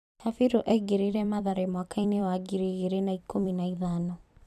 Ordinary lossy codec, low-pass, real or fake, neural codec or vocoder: none; 14.4 kHz; fake; vocoder, 44.1 kHz, 128 mel bands every 256 samples, BigVGAN v2